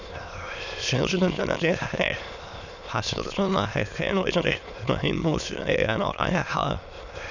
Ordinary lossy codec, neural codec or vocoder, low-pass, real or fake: none; autoencoder, 22.05 kHz, a latent of 192 numbers a frame, VITS, trained on many speakers; 7.2 kHz; fake